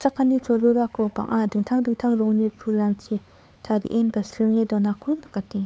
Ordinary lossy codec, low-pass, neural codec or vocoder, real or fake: none; none; codec, 16 kHz, 4 kbps, X-Codec, HuBERT features, trained on LibriSpeech; fake